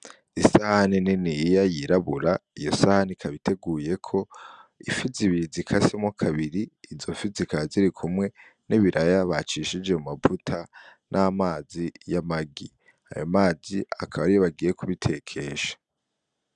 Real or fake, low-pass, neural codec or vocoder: real; 9.9 kHz; none